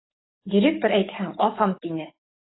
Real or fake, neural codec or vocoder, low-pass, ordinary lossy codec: fake; codec, 44.1 kHz, 7.8 kbps, DAC; 7.2 kHz; AAC, 16 kbps